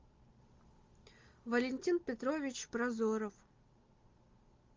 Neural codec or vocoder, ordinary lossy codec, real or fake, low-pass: none; Opus, 32 kbps; real; 7.2 kHz